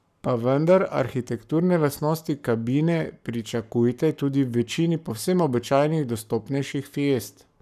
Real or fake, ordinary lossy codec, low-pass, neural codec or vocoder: fake; none; 14.4 kHz; codec, 44.1 kHz, 7.8 kbps, Pupu-Codec